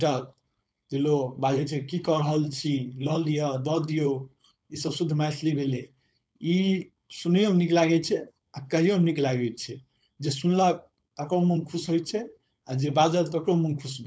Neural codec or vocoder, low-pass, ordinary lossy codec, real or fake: codec, 16 kHz, 4.8 kbps, FACodec; none; none; fake